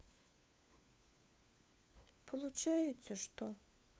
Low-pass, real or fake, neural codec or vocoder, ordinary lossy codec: none; fake; codec, 16 kHz, 4 kbps, FunCodec, trained on LibriTTS, 50 frames a second; none